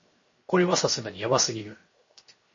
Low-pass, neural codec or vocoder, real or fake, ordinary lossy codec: 7.2 kHz; codec, 16 kHz, 0.7 kbps, FocalCodec; fake; MP3, 32 kbps